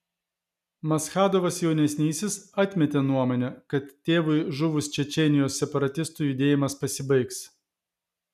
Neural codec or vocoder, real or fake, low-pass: none; real; 14.4 kHz